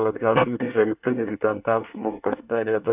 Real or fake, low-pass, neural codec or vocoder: fake; 3.6 kHz; codec, 24 kHz, 1 kbps, SNAC